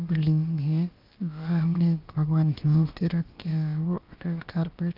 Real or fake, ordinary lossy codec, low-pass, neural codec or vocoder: fake; Opus, 32 kbps; 5.4 kHz; codec, 16 kHz, about 1 kbps, DyCAST, with the encoder's durations